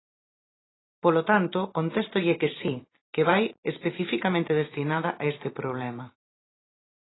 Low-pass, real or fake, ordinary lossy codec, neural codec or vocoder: 7.2 kHz; fake; AAC, 16 kbps; vocoder, 44.1 kHz, 128 mel bands every 512 samples, BigVGAN v2